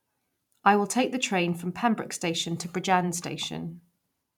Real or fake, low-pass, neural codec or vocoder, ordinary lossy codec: fake; 19.8 kHz; vocoder, 44.1 kHz, 128 mel bands every 512 samples, BigVGAN v2; none